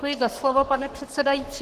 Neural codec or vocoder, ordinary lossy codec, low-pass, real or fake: codec, 44.1 kHz, 7.8 kbps, Pupu-Codec; Opus, 16 kbps; 14.4 kHz; fake